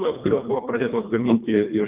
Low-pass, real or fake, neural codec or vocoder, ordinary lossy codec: 3.6 kHz; fake; codec, 24 kHz, 1.5 kbps, HILCodec; Opus, 24 kbps